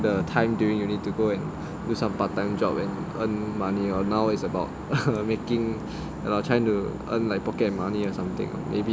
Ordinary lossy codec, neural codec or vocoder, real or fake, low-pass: none; none; real; none